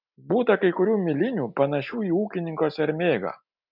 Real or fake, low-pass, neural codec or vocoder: real; 5.4 kHz; none